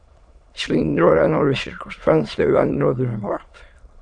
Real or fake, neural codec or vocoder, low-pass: fake; autoencoder, 22.05 kHz, a latent of 192 numbers a frame, VITS, trained on many speakers; 9.9 kHz